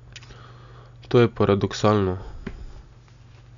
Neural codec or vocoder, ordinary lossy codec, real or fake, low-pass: none; none; real; 7.2 kHz